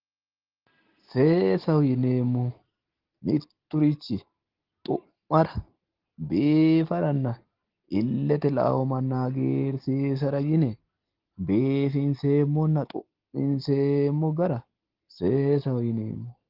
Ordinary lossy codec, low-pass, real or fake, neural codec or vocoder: Opus, 16 kbps; 5.4 kHz; real; none